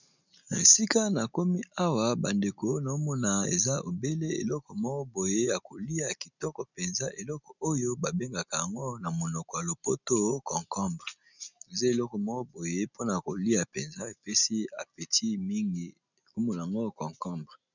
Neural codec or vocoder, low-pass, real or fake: none; 7.2 kHz; real